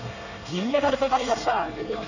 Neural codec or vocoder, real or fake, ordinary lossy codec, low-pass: codec, 24 kHz, 1 kbps, SNAC; fake; none; 7.2 kHz